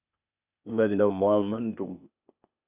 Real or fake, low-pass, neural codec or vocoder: fake; 3.6 kHz; codec, 16 kHz, 0.8 kbps, ZipCodec